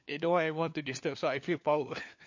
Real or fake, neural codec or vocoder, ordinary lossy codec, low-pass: fake; codec, 16 kHz, 4 kbps, FunCodec, trained on LibriTTS, 50 frames a second; MP3, 48 kbps; 7.2 kHz